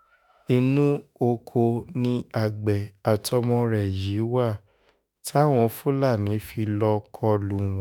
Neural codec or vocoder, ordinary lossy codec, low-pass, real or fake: autoencoder, 48 kHz, 32 numbers a frame, DAC-VAE, trained on Japanese speech; none; none; fake